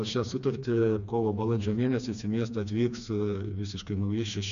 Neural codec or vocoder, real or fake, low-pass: codec, 16 kHz, 2 kbps, FreqCodec, smaller model; fake; 7.2 kHz